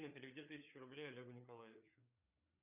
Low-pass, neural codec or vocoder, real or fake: 3.6 kHz; codec, 16 kHz, 4 kbps, FreqCodec, larger model; fake